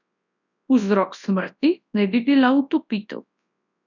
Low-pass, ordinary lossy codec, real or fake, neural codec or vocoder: 7.2 kHz; none; fake; codec, 24 kHz, 0.9 kbps, WavTokenizer, large speech release